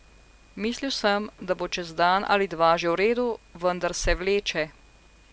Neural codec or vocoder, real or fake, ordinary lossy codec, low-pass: none; real; none; none